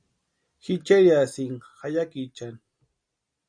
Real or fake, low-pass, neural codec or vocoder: real; 9.9 kHz; none